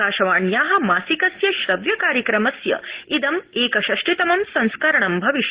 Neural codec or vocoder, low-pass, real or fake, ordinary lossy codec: none; 3.6 kHz; real; Opus, 16 kbps